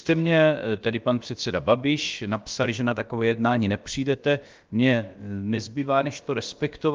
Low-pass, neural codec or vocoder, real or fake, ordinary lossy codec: 7.2 kHz; codec, 16 kHz, about 1 kbps, DyCAST, with the encoder's durations; fake; Opus, 24 kbps